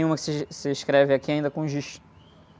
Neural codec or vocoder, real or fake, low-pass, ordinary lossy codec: none; real; none; none